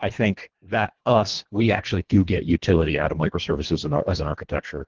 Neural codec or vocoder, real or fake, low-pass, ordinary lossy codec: codec, 24 kHz, 1.5 kbps, HILCodec; fake; 7.2 kHz; Opus, 16 kbps